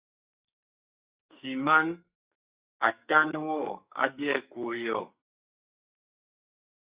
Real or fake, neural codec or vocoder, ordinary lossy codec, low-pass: fake; codec, 44.1 kHz, 2.6 kbps, SNAC; Opus, 64 kbps; 3.6 kHz